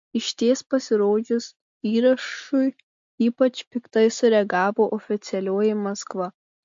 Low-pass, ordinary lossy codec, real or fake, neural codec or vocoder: 7.2 kHz; MP3, 48 kbps; real; none